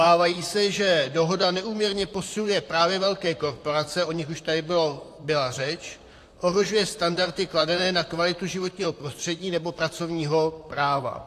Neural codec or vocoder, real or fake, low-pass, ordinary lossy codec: vocoder, 44.1 kHz, 128 mel bands, Pupu-Vocoder; fake; 14.4 kHz; AAC, 64 kbps